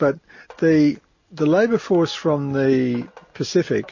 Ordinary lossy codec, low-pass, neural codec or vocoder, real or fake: MP3, 32 kbps; 7.2 kHz; none; real